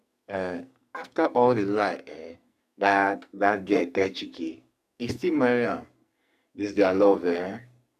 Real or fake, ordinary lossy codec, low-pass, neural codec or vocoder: fake; none; 14.4 kHz; codec, 44.1 kHz, 2.6 kbps, SNAC